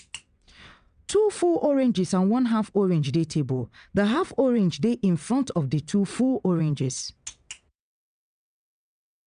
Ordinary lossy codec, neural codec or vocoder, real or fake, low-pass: none; vocoder, 22.05 kHz, 80 mel bands, WaveNeXt; fake; 9.9 kHz